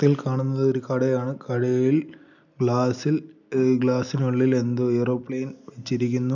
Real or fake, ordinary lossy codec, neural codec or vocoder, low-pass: real; none; none; 7.2 kHz